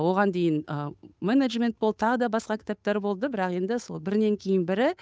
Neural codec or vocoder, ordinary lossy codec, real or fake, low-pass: codec, 16 kHz, 8 kbps, FunCodec, trained on Chinese and English, 25 frames a second; none; fake; none